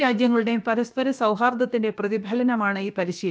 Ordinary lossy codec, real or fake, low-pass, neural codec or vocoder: none; fake; none; codec, 16 kHz, about 1 kbps, DyCAST, with the encoder's durations